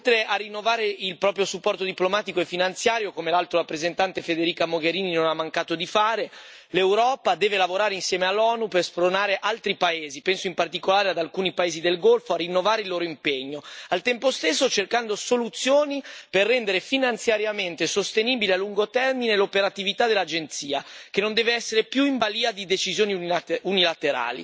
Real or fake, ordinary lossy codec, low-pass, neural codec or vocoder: real; none; none; none